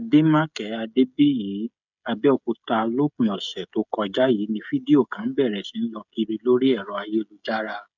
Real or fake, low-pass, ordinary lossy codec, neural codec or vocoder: fake; 7.2 kHz; none; codec, 16 kHz, 16 kbps, FreqCodec, smaller model